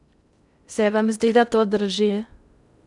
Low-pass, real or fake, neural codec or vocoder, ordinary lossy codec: 10.8 kHz; fake; codec, 16 kHz in and 24 kHz out, 0.6 kbps, FocalCodec, streaming, 2048 codes; none